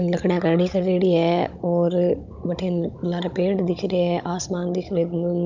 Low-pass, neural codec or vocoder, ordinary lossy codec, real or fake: 7.2 kHz; codec, 16 kHz, 16 kbps, FunCodec, trained on Chinese and English, 50 frames a second; none; fake